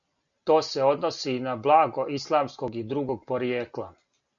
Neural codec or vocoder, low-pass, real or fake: none; 7.2 kHz; real